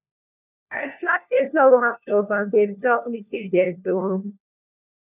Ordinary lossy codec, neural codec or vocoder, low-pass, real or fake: none; codec, 16 kHz, 1 kbps, FunCodec, trained on LibriTTS, 50 frames a second; 3.6 kHz; fake